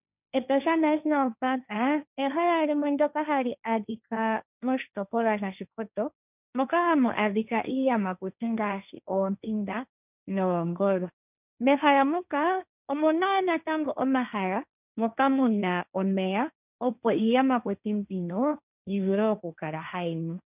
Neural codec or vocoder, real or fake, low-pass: codec, 16 kHz, 1.1 kbps, Voila-Tokenizer; fake; 3.6 kHz